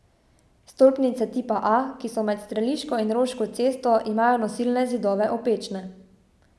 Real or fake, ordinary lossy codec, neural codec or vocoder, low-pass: real; none; none; none